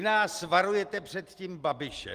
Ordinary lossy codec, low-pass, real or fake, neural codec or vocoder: Opus, 24 kbps; 14.4 kHz; real; none